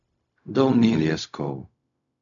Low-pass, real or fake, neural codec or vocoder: 7.2 kHz; fake; codec, 16 kHz, 0.4 kbps, LongCat-Audio-Codec